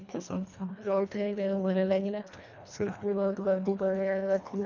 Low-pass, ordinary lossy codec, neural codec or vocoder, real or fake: 7.2 kHz; none; codec, 24 kHz, 1.5 kbps, HILCodec; fake